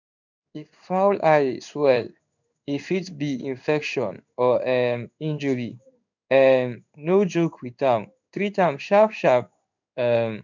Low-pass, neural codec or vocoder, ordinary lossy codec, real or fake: 7.2 kHz; codec, 16 kHz in and 24 kHz out, 1 kbps, XY-Tokenizer; none; fake